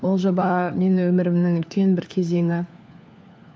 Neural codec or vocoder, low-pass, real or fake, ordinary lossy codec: codec, 16 kHz, 4 kbps, FunCodec, trained on LibriTTS, 50 frames a second; none; fake; none